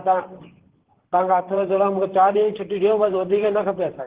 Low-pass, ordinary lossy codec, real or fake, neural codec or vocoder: 3.6 kHz; Opus, 16 kbps; fake; vocoder, 44.1 kHz, 128 mel bands, Pupu-Vocoder